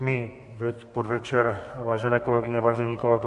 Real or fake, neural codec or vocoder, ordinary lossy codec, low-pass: fake; codec, 32 kHz, 1.9 kbps, SNAC; MP3, 48 kbps; 14.4 kHz